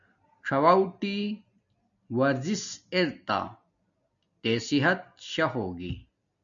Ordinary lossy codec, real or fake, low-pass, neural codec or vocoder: MP3, 96 kbps; real; 7.2 kHz; none